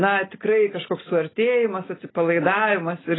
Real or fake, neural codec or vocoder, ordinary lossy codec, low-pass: real; none; AAC, 16 kbps; 7.2 kHz